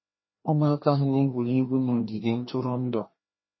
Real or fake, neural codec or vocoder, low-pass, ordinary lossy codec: fake; codec, 16 kHz, 1 kbps, FreqCodec, larger model; 7.2 kHz; MP3, 24 kbps